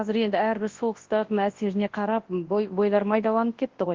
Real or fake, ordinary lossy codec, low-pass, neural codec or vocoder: fake; Opus, 16 kbps; 7.2 kHz; codec, 24 kHz, 0.9 kbps, WavTokenizer, large speech release